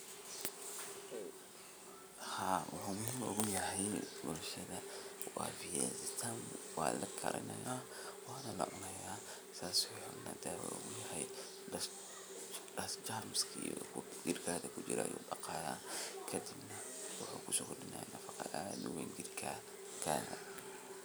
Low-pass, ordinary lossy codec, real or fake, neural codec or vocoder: none; none; real; none